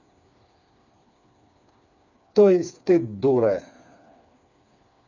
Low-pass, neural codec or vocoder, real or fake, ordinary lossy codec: 7.2 kHz; codec, 16 kHz, 4 kbps, FreqCodec, smaller model; fake; none